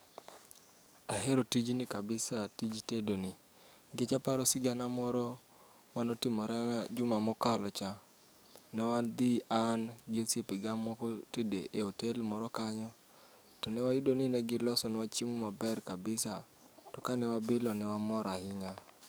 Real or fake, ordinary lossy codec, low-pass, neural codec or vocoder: fake; none; none; codec, 44.1 kHz, 7.8 kbps, DAC